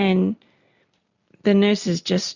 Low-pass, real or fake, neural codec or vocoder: 7.2 kHz; real; none